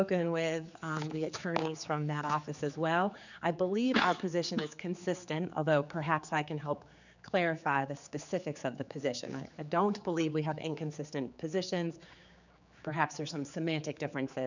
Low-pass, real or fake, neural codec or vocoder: 7.2 kHz; fake; codec, 16 kHz, 4 kbps, X-Codec, HuBERT features, trained on general audio